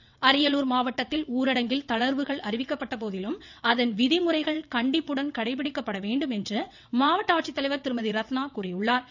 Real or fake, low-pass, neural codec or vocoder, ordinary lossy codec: fake; 7.2 kHz; vocoder, 22.05 kHz, 80 mel bands, WaveNeXt; none